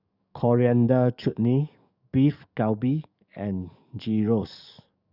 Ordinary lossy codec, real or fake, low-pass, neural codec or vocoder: none; fake; 5.4 kHz; codec, 44.1 kHz, 7.8 kbps, DAC